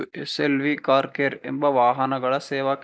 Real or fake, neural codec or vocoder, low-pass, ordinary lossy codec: real; none; none; none